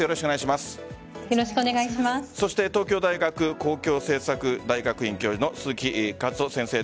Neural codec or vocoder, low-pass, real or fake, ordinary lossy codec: none; none; real; none